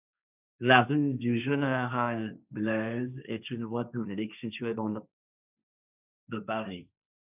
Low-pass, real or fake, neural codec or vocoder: 3.6 kHz; fake; codec, 16 kHz, 1.1 kbps, Voila-Tokenizer